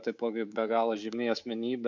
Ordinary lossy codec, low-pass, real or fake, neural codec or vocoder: MP3, 64 kbps; 7.2 kHz; fake; codec, 24 kHz, 3.1 kbps, DualCodec